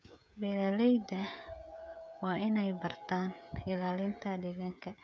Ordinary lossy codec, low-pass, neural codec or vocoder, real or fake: none; none; codec, 16 kHz, 16 kbps, FreqCodec, smaller model; fake